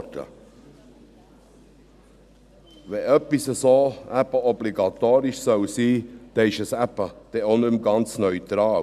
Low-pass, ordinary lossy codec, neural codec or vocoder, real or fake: 14.4 kHz; none; none; real